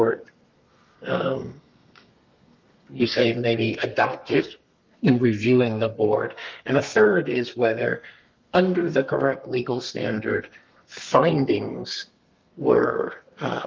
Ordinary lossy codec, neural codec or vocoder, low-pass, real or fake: Opus, 32 kbps; codec, 32 kHz, 1.9 kbps, SNAC; 7.2 kHz; fake